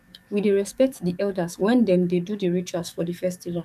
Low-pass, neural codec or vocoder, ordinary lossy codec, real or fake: 14.4 kHz; codec, 44.1 kHz, 7.8 kbps, DAC; none; fake